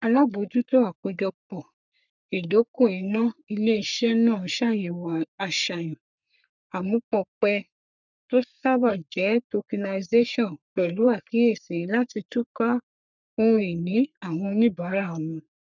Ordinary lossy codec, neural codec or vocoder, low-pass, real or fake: none; codec, 44.1 kHz, 3.4 kbps, Pupu-Codec; 7.2 kHz; fake